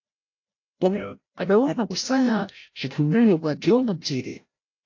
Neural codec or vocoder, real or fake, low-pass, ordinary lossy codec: codec, 16 kHz, 0.5 kbps, FreqCodec, larger model; fake; 7.2 kHz; AAC, 48 kbps